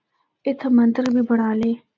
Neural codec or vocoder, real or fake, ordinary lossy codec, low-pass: none; real; MP3, 64 kbps; 7.2 kHz